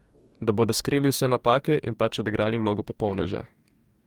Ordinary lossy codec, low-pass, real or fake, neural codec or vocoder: Opus, 32 kbps; 19.8 kHz; fake; codec, 44.1 kHz, 2.6 kbps, DAC